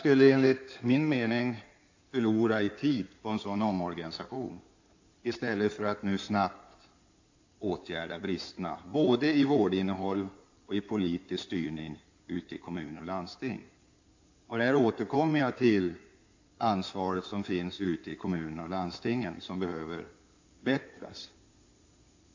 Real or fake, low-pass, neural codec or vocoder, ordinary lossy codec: fake; 7.2 kHz; codec, 16 kHz in and 24 kHz out, 2.2 kbps, FireRedTTS-2 codec; AAC, 48 kbps